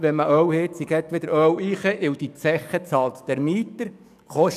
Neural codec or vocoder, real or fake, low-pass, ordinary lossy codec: codec, 44.1 kHz, 7.8 kbps, DAC; fake; 14.4 kHz; none